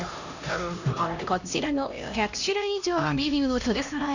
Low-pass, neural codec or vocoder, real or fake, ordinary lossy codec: 7.2 kHz; codec, 16 kHz, 1 kbps, X-Codec, HuBERT features, trained on LibriSpeech; fake; none